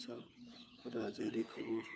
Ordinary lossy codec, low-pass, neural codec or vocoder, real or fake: none; none; codec, 16 kHz, 4 kbps, FunCodec, trained on LibriTTS, 50 frames a second; fake